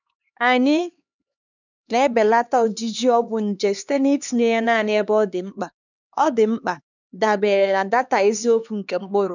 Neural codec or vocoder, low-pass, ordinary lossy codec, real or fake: codec, 16 kHz, 2 kbps, X-Codec, HuBERT features, trained on LibriSpeech; 7.2 kHz; none; fake